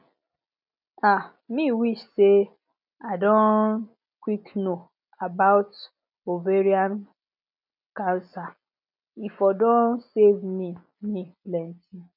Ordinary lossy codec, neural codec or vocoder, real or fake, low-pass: none; none; real; 5.4 kHz